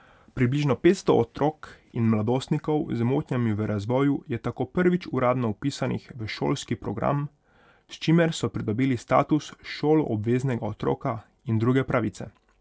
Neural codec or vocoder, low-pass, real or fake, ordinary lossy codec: none; none; real; none